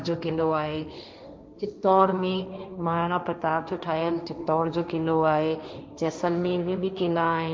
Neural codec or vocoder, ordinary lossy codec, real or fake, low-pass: codec, 16 kHz, 1.1 kbps, Voila-Tokenizer; none; fake; none